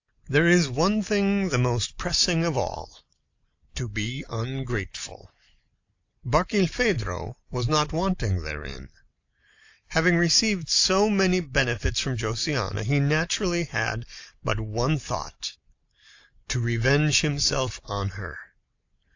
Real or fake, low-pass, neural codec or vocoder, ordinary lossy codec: real; 7.2 kHz; none; AAC, 48 kbps